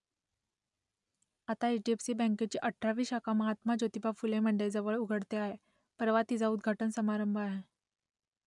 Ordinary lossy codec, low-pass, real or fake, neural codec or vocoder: none; 10.8 kHz; real; none